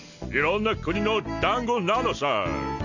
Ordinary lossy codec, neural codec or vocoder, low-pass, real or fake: none; none; 7.2 kHz; real